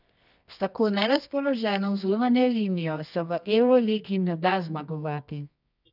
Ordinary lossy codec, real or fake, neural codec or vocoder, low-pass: none; fake; codec, 24 kHz, 0.9 kbps, WavTokenizer, medium music audio release; 5.4 kHz